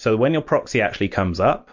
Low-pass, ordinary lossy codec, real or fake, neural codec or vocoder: 7.2 kHz; MP3, 48 kbps; real; none